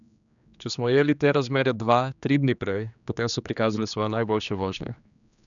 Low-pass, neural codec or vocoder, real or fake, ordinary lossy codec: 7.2 kHz; codec, 16 kHz, 2 kbps, X-Codec, HuBERT features, trained on general audio; fake; none